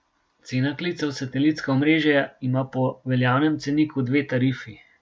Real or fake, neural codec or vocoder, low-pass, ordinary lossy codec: real; none; none; none